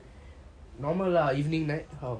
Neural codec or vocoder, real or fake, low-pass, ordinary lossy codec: none; real; 9.9 kHz; none